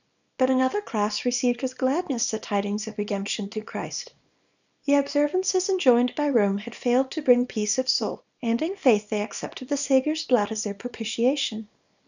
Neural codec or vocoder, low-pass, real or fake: codec, 24 kHz, 0.9 kbps, WavTokenizer, small release; 7.2 kHz; fake